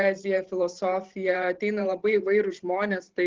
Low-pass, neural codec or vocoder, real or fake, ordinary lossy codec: 7.2 kHz; vocoder, 44.1 kHz, 128 mel bands every 512 samples, BigVGAN v2; fake; Opus, 16 kbps